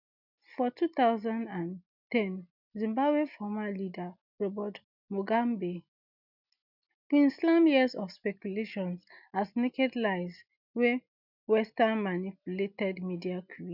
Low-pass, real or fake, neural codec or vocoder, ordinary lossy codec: 5.4 kHz; real; none; none